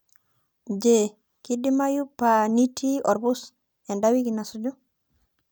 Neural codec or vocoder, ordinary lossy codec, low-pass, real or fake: none; none; none; real